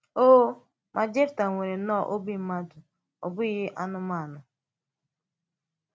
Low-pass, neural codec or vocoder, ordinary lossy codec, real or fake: none; none; none; real